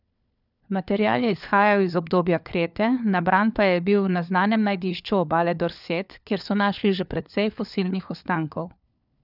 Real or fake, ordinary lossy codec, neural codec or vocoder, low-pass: fake; none; codec, 16 kHz, 4 kbps, FunCodec, trained on LibriTTS, 50 frames a second; 5.4 kHz